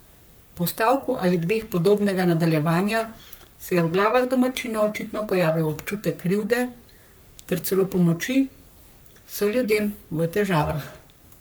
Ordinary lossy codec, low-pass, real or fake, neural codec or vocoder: none; none; fake; codec, 44.1 kHz, 3.4 kbps, Pupu-Codec